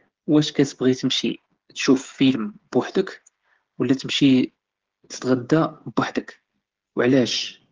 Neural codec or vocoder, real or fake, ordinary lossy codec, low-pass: codec, 16 kHz, 8 kbps, FreqCodec, smaller model; fake; Opus, 16 kbps; 7.2 kHz